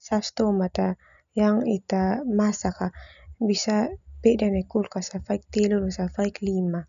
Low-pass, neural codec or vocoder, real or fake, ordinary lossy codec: 7.2 kHz; none; real; none